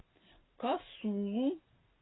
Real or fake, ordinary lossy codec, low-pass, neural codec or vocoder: real; AAC, 16 kbps; 7.2 kHz; none